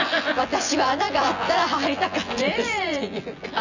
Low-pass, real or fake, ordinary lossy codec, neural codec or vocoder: 7.2 kHz; fake; none; vocoder, 24 kHz, 100 mel bands, Vocos